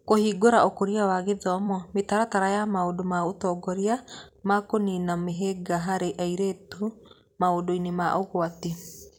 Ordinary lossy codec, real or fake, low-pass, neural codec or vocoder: none; fake; 19.8 kHz; vocoder, 44.1 kHz, 128 mel bands every 256 samples, BigVGAN v2